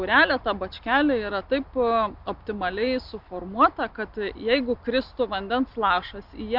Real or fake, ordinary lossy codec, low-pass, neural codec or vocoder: real; Opus, 64 kbps; 5.4 kHz; none